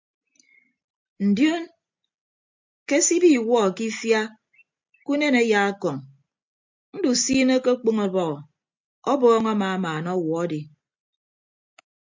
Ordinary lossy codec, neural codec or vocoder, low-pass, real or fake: MP3, 64 kbps; none; 7.2 kHz; real